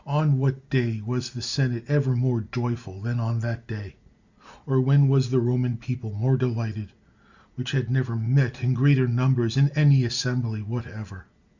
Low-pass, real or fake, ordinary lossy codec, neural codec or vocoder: 7.2 kHz; real; Opus, 64 kbps; none